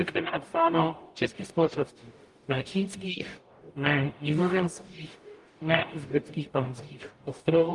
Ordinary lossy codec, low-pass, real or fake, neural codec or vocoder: Opus, 32 kbps; 10.8 kHz; fake; codec, 44.1 kHz, 0.9 kbps, DAC